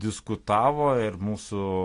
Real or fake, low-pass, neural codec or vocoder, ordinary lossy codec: real; 10.8 kHz; none; AAC, 48 kbps